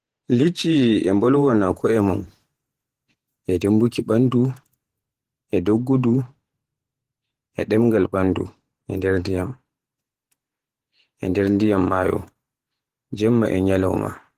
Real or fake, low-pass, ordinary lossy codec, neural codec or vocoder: fake; 14.4 kHz; Opus, 16 kbps; vocoder, 48 kHz, 128 mel bands, Vocos